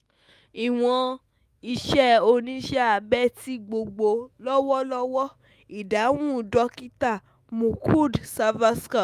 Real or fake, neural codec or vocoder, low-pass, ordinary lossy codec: fake; autoencoder, 48 kHz, 128 numbers a frame, DAC-VAE, trained on Japanese speech; 14.4 kHz; Opus, 32 kbps